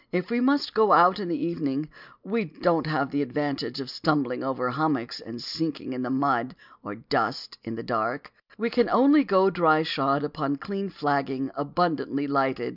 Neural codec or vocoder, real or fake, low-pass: none; real; 5.4 kHz